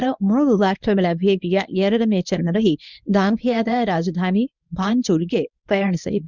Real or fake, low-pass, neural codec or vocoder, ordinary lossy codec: fake; 7.2 kHz; codec, 24 kHz, 0.9 kbps, WavTokenizer, medium speech release version 1; none